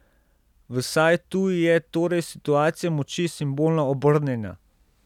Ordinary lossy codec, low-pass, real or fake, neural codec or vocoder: none; 19.8 kHz; real; none